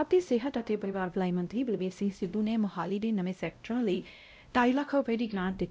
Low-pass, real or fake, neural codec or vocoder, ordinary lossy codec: none; fake; codec, 16 kHz, 0.5 kbps, X-Codec, WavLM features, trained on Multilingual LibriSpeech; none